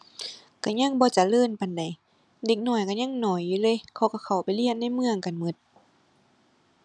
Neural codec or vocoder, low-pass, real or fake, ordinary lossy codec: none; none; real; none